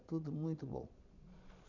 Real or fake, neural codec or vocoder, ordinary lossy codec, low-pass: fake; vocoder, 22.05 kHz, 80 mel bands, Vocos; none; 7.2 kHz